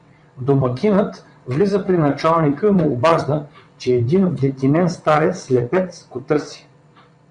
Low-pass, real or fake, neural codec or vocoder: 9.9 kHz; fake; vocoder, 22.05 kHz, 80 mel bands, WaveNeXt